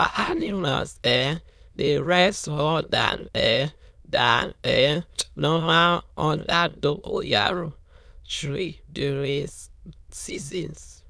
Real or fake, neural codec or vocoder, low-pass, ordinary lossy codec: fake; autoencoder, 22.05 kHz, a latent of 192 numbers a frame, VITS, trained on many speakers; none; none